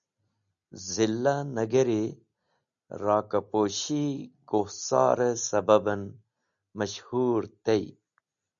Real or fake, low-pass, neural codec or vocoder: real; 7.2 kHz; none